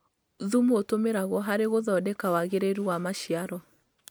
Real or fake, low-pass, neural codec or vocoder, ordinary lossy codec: real; none; none; none